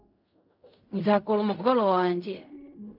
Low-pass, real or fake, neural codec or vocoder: 5.4 kHz; fake; codec, 16 kHz in and 24 kHz out, 0.4 kbps, LongCat-Audio-Codec, fine tuned four codebook decoder